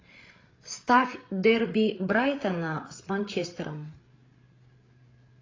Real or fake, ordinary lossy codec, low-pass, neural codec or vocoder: fake; AAC, 32 kbps; 7.2 kHz; codec, 16 kHz, 8 kbps, FreqCodec, larger model